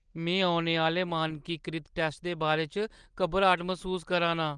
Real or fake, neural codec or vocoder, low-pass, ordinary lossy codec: real; none; 9.9 kHz; Opus, 24 kbps